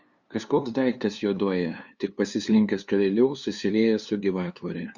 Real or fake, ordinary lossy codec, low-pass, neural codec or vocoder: fake; Opus, 64 kbps; 7.2 kHz; codec, 16 kHz, 2 kbps, FunCodec, trained on LibriTTS, 25 frames a second